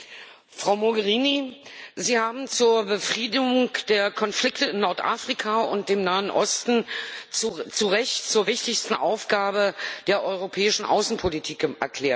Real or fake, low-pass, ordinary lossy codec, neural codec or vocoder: real; none; none; none